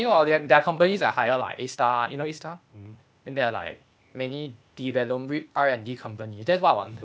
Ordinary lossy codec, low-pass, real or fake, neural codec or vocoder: none; none; fake; codec, 16 kHz, 0.8 kbps, ZipCodec